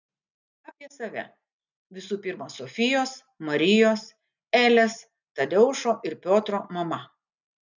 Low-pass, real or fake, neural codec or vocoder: 7.2 kHz; real; none